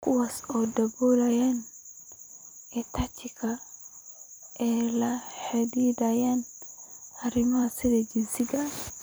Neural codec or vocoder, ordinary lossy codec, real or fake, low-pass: vocoder, 44.1 kHz, 128 mel bands every 256 samples, BigVGAN v2; none; fake; none